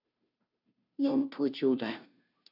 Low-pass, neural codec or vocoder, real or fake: 5.4 kHz; codec, 16 kHz, 0.5 kbps, FunCodec, trained on Chinese and English, 25 frames a second; fake